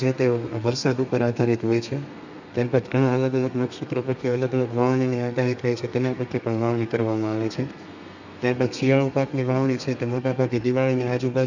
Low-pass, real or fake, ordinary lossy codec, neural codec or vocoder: 7.2 kHz; fake; none; codec, 32 kHz, 1.9 kbps, SNAC